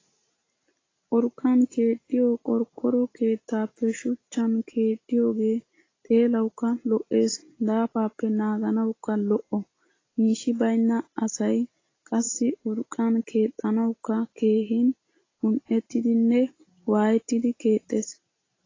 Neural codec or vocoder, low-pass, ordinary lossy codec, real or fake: none; 7.2 kHz; AAC, 32 kbps; real